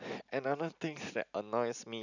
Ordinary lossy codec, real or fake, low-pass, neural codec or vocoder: none; real; 7.2 kHz; none